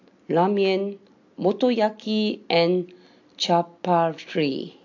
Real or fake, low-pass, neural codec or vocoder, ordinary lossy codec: real; 7.2 kHz; none; AAC, 48 kbps